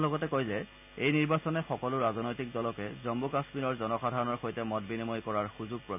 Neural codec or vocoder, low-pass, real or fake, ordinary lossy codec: none; 3.6 kHz; real; none